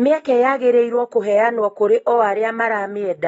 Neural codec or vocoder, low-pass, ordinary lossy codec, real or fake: none; 19.8 kHz; AAC, 24 kbps; real